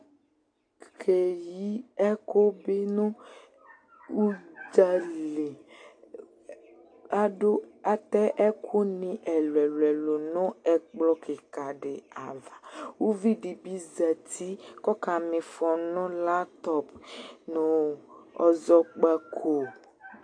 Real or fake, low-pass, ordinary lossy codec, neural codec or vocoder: real; 9.9 kHz; AAC, 48 kbps; none